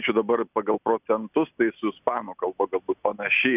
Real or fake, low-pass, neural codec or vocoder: real; 3.6 kHz; none